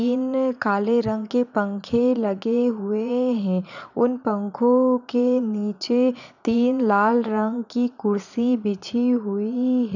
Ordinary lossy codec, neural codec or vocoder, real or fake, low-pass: none; vocoder, 22.05 kHz, 80 mel bands, Vocos; fake; 7.2 kHz